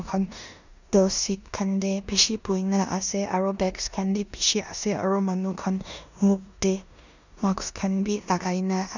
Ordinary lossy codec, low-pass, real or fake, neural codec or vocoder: none; 7.2 kHz; fake; codec, 16 kHz in and 24 kHz out, 0.9 kbps, LongCat-Audio-Codec, four codebook decoder